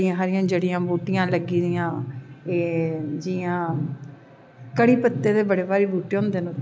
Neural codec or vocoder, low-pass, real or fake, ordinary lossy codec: none; none; real; none